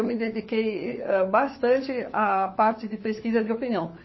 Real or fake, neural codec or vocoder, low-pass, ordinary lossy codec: fake; codec, 16 kHz, 4 kbps, FunCodec, trained on LibriTTS, 50 frames a second; 7.2 kHz; MP3, 24 kbps